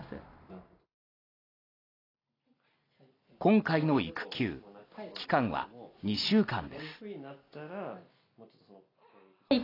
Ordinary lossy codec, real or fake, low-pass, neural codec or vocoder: AAC, 24 kbps; real; 5.4 kHz; none